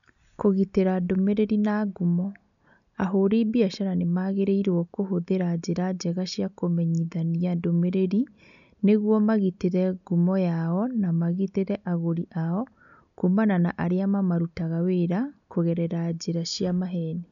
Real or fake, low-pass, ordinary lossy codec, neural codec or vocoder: real; 7.2 kHz; none; none